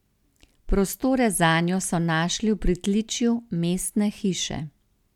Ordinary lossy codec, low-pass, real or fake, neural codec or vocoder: none; 19.8 kHz; real; none